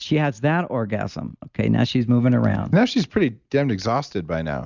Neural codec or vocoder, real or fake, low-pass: none; real; 7.2 kHz